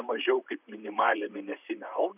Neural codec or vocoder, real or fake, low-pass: vocoder, 44.1 kHz, 128 mel bands, Pupu-Vocoder; fake; 3.6 kHz